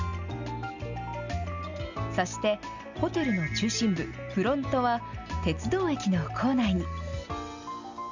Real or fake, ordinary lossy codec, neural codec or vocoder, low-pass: real; none; none; 7.2 kHz